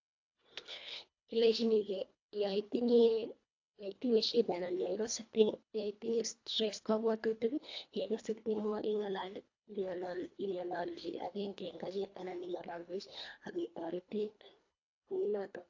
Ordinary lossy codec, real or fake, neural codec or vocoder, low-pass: AAC, 48 kbps; fake; codec, 24 kHz, 1.5 kbps, HILCodec; 7.2 kHz